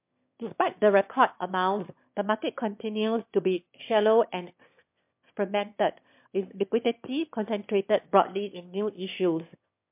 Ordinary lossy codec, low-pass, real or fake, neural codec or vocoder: MP3, 32 kbps; 3.6 kHz; fake; autoencoder, 22.05 kHz, a latent of 192 numbers a frame, VITS, trained on one speaker